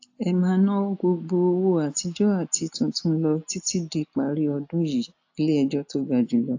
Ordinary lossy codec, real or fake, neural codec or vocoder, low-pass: AAC, 48 kbps; real; none; 7.2 kHz